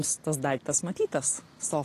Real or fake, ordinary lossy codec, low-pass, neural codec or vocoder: real; AAC, 48 kbps; 14.4 kHz; none